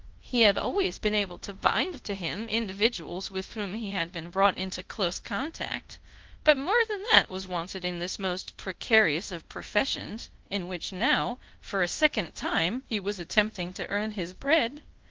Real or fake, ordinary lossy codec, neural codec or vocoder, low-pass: fake; Opus, 16 kbps; codec, 24 kHz, 0.5 kbps, DualCodec; 7.2 kHz